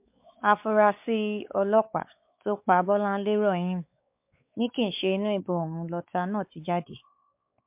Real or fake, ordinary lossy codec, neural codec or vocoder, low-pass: fake; MP3, 32 kbps; codec, 16 kHz, 4 kbps, X-Codec, WavLM features, trained on Multilingual LibriSpeech; 3.6 kHz